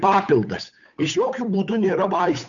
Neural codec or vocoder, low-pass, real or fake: codec, 16 kHz, 8 kbps, FunCodec, trained on Chinese and English, 25 frames a second; 7.2 kHz; fake